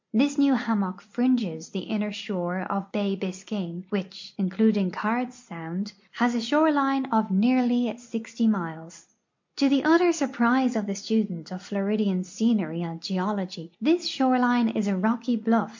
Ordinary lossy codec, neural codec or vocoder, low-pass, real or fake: MP3, 48 kbps; none; 7.2 kHz; real